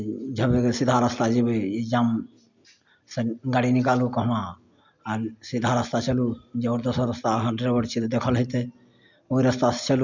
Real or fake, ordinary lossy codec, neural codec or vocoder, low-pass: real; MP3, 64 kbps; none; 7.2 kHz